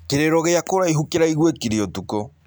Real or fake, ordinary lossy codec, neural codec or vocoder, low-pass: real; none; none; none